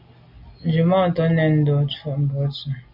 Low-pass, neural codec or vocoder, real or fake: 5.4 kHz; none; real